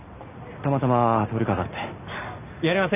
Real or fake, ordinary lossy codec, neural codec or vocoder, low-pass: real; none; none; 3.6 kHz